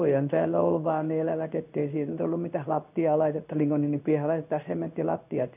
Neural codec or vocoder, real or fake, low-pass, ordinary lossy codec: codec, 16 kHz in and 24 kHz out, 1 kbps, XY-Tokenizer; fake; 3.6 kHz; none